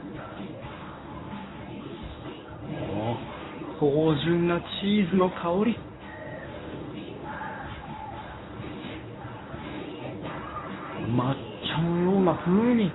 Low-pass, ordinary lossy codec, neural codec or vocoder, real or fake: 7.2 kHz; AAC, 16 kbps; codec, 24 kHz, 0.9 kbps, WavTokenizer, medium speech release version 1; fake